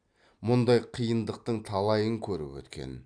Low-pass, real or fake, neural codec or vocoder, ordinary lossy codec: 9.9 kHz; real; none; none